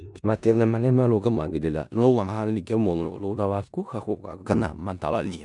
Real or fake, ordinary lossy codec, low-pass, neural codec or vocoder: fake; none; 10.8 kHz; codec, 16 kHz in and 24 kHz out, 0.4 kbps, LongCat-Audio-Codec, four codebook decoder